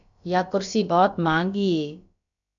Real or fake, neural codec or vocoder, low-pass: fake; codec, 16 kHz, about 1 kbps, DyCAST, with the encoder's durations; 7.2 kHz